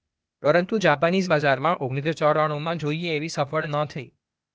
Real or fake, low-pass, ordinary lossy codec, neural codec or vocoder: fake; none; none; codec, 16 kHz, 0.8 kbps, ZipCodec